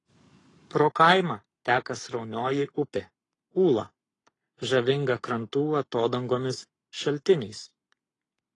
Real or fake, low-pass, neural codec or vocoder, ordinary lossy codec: fake; 10.8 kHz; codec, 44.1 kHz, 7.8 kbps, Pupu-Codec; AAC, 32 kbps